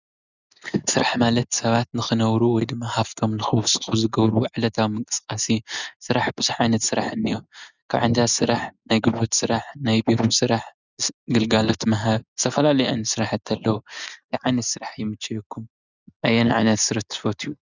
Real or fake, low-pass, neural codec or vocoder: real; 7.2 kHz; none